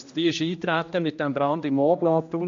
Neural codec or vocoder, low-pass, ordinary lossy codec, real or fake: codec, 16 kHz, 1 kbps, X-Codec, HuBERT features, trained on general audio; 7.2 kHz; MP3, 48 kbps; fake